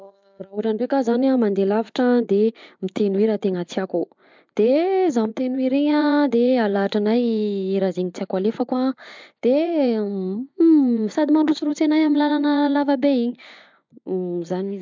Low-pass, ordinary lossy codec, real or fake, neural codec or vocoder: 7.2 kHz; MP3, 64 kbps; fake; vocoder, 24 kHz, 100 mel bands, Vocos